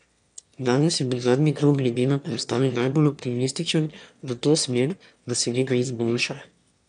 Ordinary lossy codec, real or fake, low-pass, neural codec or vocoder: none; fake; 9.9 kHz; autoencoder, 22.05 kHz, a latent of 192 numbers a frame, VITS, trained on one speaker